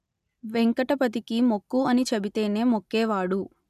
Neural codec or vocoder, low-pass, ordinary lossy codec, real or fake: none; 14.4 kHz; none; real